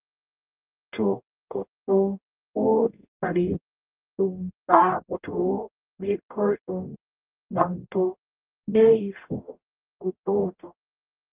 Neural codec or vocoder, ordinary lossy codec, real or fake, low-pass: codec, 44.1 kHz, 0.9 kbps, DAC; Opus, 24 kbps; fake; 3.6 kHz